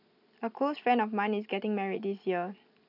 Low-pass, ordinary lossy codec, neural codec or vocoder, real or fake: 5.4 kHz; none; none; real